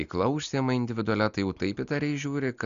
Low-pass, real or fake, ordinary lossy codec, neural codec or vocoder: 7.2 kHz; real; Opus, 64 kbps; none